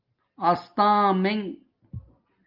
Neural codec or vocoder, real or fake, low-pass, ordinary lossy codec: none; real; 5.4 kHz; Opus, 24 kbps